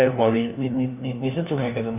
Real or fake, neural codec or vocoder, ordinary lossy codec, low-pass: fake; codec, 16 kHz, 1 kbps, FunCodec, trained on LibriTTS, 50 frames a second; none; 3.6 kHz